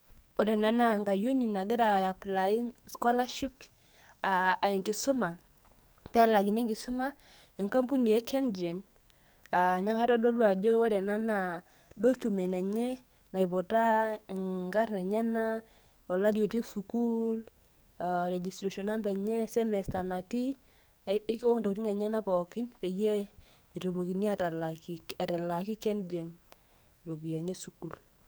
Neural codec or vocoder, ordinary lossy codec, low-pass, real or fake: codec, 44.1 kHz, 2.6 kbps, SNAC; none; none; fake